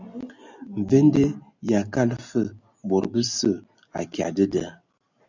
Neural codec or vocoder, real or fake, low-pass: none; real; 7.2 kHz